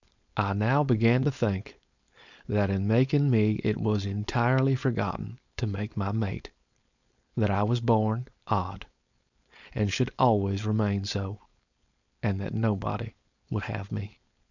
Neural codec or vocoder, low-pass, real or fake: codec, 16 kHz, 4.8 kbps, FACodec; 7.2 kHz; fake